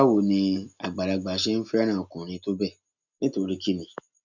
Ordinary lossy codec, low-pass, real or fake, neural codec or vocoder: none; 7.2 kHz; real; none